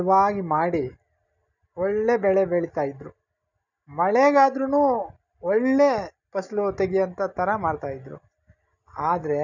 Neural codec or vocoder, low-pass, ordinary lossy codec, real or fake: none; 7.2 kHz; none; real